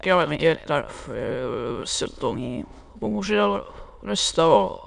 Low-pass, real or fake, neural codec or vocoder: 9.9 kHz; fake; autoencoder, 22.05 kHz, a latent of 192 numbers a frame, VITS, trained on many speakers